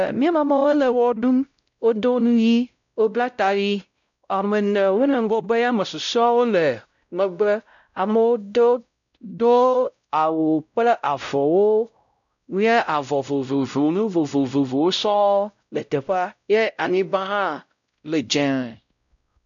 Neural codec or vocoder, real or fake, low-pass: codec, 16 kHz, 0.5 kbps, X-Codec, WavLM features, trained on Multilingual LibriSpeech; fake; 7.2 kHz